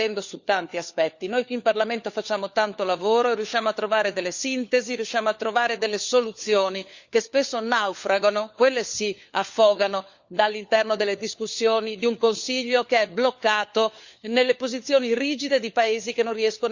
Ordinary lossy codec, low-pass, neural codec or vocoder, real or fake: Opus, 64 kbps; 7.2 kHz; codec, 16 kHz, 4 kbps, FunCodec, trained on LibriTTS, 50 frames a second; fake